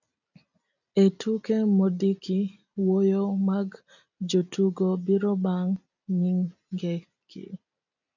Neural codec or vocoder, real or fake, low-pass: none; real; 7.2 kHz